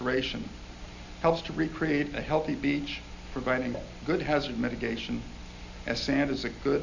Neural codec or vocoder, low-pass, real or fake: none; 7.2 kHz; real